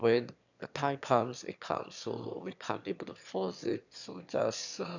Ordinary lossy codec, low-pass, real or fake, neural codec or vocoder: none; 7.2 kHz; fake; autoencoder, 22.05 kHz, a latent of 192 numbers a frame, VITS, trained on one speaker